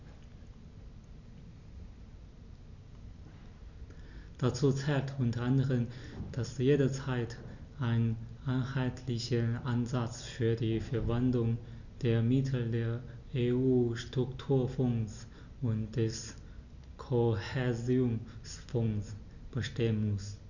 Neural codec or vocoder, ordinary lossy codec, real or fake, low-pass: none; none; real; 7.2 kHz